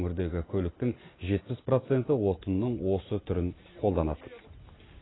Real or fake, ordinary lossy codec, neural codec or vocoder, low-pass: real; AAC, 16 kbps; none; 7.2 kHz